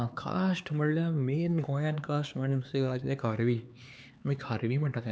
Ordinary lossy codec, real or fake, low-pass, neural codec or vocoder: none; fake; none; codec, 16 kHz, 4 kbps, X-Codec, HuBERT features, trained on LibriSpeech